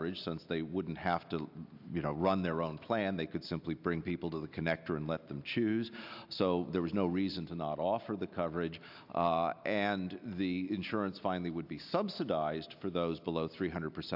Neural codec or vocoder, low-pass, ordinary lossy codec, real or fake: none; 5.4 kHz; AAC, 48 kbps; real